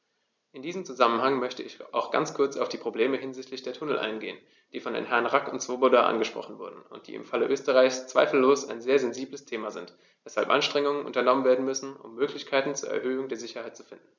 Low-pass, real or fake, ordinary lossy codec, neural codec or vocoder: 7.2 kHz; real; none; none